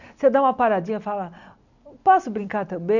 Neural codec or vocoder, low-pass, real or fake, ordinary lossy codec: none; 7.2 kHz; real; none